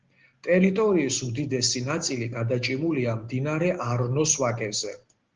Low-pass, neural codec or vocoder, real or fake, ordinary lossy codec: 7.2 kHz; none; real; Opus, 16 kbps